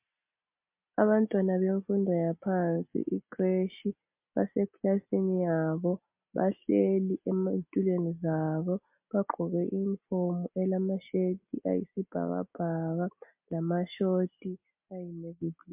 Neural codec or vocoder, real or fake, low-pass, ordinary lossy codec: none; real; 3.6 kHz; MP3, 32 kbps